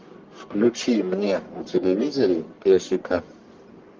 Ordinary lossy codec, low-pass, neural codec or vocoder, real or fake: Opus, 32 kbps; 7.2 kHz; codec, 44.1 kHz, 1.7 kbps, Pupu-Codec; fake